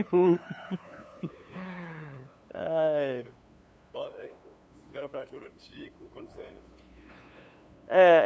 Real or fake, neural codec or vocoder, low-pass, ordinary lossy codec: fake; codec, 16 kHz, 2 kbps, FunCodec, trained on LibriTTS, 25 frames a second; none; none